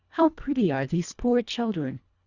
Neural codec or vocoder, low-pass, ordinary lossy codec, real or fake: codec, 24 kHz, 1.5 kbps, HILCodec; 7.2 kHz; Opus, 64 kbps; fake